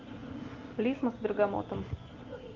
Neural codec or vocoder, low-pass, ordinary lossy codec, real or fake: none; 7.2 kHz; Opus, 32 kbps; real